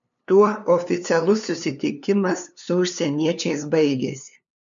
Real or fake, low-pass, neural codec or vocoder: fake; 7.2 kHz; codec, 16 kHz, 2 kbps, FunCodec, trained on LibriTTS, 25 frames a second